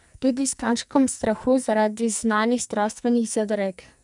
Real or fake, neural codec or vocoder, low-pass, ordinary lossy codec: fake; codec, 44.1 kHz, 2.6 kbps, SNAC; 10.8 kHz; none